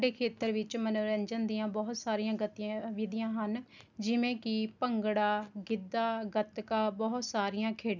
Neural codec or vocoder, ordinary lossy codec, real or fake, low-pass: none; none; real; 7.2 kHz